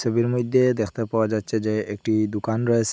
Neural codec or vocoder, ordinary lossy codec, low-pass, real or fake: none; none; none; real